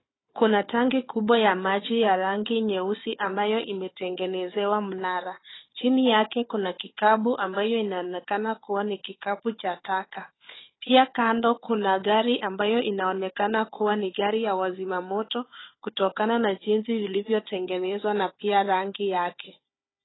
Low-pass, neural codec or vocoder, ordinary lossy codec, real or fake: 7.2 kHz; codec, 16 kHz, 4 kbps, FunCodec, trained on Chinese and English, 50 frames a second; AAC, 16 kbps; fake